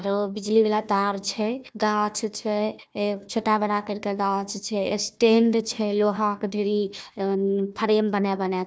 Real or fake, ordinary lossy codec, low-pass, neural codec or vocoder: fake; none; none; codec, 16 kHz, 1 kbps, FunCodec, trained on Chinese and English, 50 frames a second